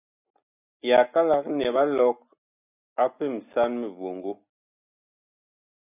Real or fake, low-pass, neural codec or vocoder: real; 3.6 kHz; none